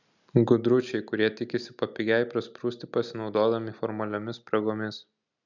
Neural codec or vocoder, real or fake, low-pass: none; real; 7.2 kHz